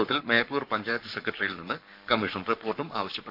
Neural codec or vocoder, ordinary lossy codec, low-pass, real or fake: codec, 44.1 kHz, 7.8 kbps, Pupu-Codec; none; 5.4 kHz; fake